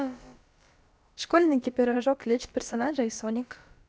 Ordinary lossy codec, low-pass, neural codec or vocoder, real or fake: none; none; codec, 16 kHz, about 1 kbps, DyCAST, with the encoder's durations; fake